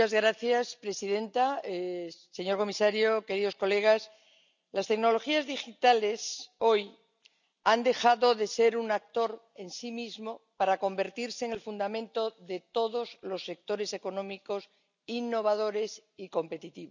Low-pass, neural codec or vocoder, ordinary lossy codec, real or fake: 7.2 kHz; none; none; real